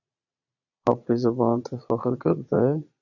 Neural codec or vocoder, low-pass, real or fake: vocoder, 22.05 kHz, 80 mel bands, Vocos; 7.2 kHz; fake